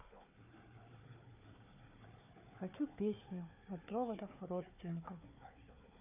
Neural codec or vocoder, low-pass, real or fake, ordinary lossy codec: codec, 16 kHz, 4 kbps, FunCodec, trained on Chinese and English, 50 frames a second; 3.6 kHz; fake; none